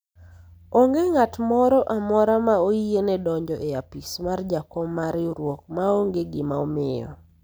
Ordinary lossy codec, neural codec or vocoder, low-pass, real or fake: none; none; none; real